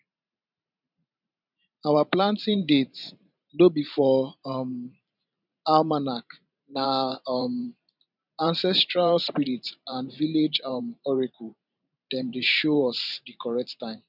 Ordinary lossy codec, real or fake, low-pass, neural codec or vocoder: none; fake; 5.4 kHz; vocoder, 44.1 kHz, 128 mel bands every 512 samples, BigVGAN v2